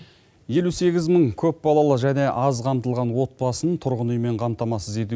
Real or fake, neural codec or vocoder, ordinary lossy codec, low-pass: real; none; none; none